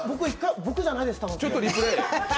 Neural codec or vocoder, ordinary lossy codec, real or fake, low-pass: none; none; real; none